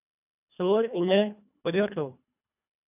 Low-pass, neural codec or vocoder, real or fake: 3.6 kHz; codec, 24 kHz, 1.5 kbps, HILCodec; fake